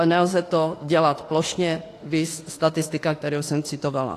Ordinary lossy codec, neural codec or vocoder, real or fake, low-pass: AAC, 48 kbps; autoencoder, 48 kHz, 32 numbers a frame, DAC-VAE, trained on Japanese speech; fake; 14.4 kHz